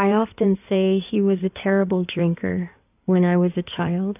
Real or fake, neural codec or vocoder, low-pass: fake; codec, 16 kHz in and 24 kHz out, 2.2 kbps, FireRedTTS-2 codec; 3.6 kHz